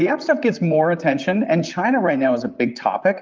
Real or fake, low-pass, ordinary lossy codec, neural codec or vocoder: fake; 7.2 kHz; Opus, 24 kbps; vocoder, 44.1 kHz, 128 mel bands, Pupu-Vocoder